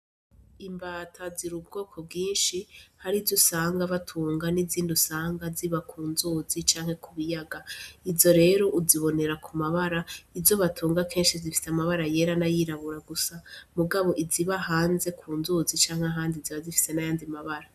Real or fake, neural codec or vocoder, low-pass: real; none; 14.4 kHz